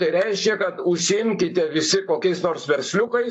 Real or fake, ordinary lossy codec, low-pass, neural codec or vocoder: fake; AAC, 64 kbps; 10.8 kHz; codec, 44.1 kHz, 7.8 kbps, Pupu-Codec